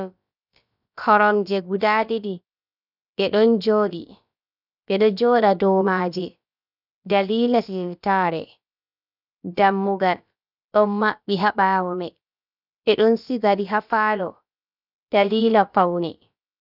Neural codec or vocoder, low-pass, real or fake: codec, 16 kHz, about 1 kbps, DyCAST, with the encoder's durations; 5.4 kHz; fake